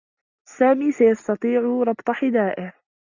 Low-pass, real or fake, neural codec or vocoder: 7.2 kHz; real; none